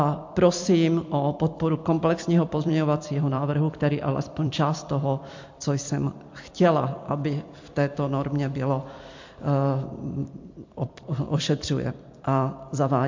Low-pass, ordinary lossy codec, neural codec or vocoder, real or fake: 7.2 kHz; MP3, 48 kbps; vocoder, 44.1 kHz, 128 mel bands every 256 samples, BigVGAN v2; fake